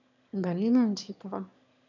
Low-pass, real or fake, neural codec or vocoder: 7.2 kHz; fake; autoencoder, 22.05 kHz, a latent of 192 numbers a frame, VITS, trained on one speaker